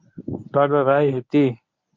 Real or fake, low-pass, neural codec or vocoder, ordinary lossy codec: fake; 7.2 kHz; codec, 24 kHz, 0.9 kbps, WavTokenizer, medium speech release version 1; MP3, 64 kbps